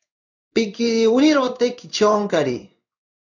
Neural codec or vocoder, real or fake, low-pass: codec, 16 kHz in and 24 kHz out, 1 kbps, XY-Tokenizer; fake; 7.2 kHz